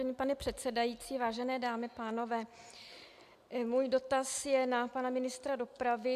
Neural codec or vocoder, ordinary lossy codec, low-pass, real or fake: none; AAC, 96 kbps; 14.4 kHz; real